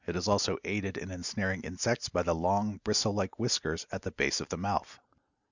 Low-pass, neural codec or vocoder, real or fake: 7.2 kHz; none; real